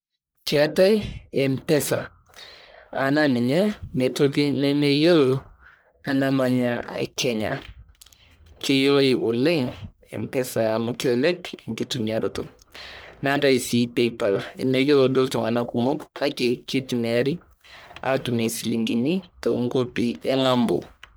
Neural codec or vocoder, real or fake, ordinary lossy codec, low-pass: codec, 44.1 kHz, 1.7 kbps, Pupu-Codec; fake; none; none